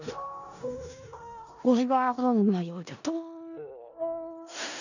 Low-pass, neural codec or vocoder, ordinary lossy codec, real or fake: 7.2 kHz; codec, 16 kHz in and 24 kHz out, 0.4 kbps, LongCat-Audio-Codec, four codebook decoder; none; fake